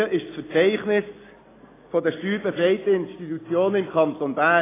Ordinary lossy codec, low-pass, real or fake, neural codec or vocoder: AAC, 16 kbps; 3.6 kHz; real; none